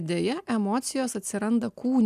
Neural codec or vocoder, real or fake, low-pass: none; real; 14.4 kHz